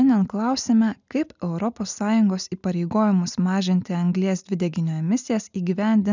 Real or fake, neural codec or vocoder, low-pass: real; none; 7.2 kHz